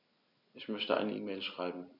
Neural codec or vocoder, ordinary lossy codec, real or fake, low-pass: none; none; real; 5.4 kHz